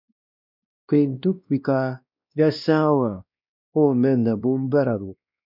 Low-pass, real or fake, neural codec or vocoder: 5.4 kHz; fake; codec, 16 kHz, 1 kbps, X-Codec, WavLM features, trained on Multilingual LibriSpeech